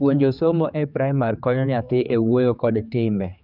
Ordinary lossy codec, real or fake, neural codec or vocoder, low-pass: none; fake; codec, 16 kHz, 4 kbps, X-Codec, HuBERT features, trained on general audio; 5.4 kHz